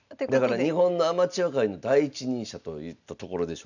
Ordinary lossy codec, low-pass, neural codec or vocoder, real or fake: none; 7.2 kHz; none; real